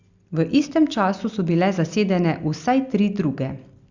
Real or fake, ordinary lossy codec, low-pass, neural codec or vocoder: real; Opus, 64 kbps; 7.2 kHz; none